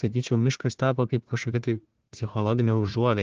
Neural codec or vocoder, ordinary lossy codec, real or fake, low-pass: codec, 16 kHz, 1 kbps, FunCodec, trained on Chinese and English, 50 frames a second; Opus, 16 kbps; fake; 7.2 kHz